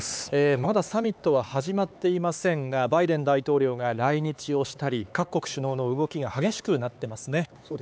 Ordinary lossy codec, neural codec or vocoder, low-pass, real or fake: none; codec, 16 kHz, 4 kbps, X-Codec, HuBERT features, trained on LibriSpeech; none; fake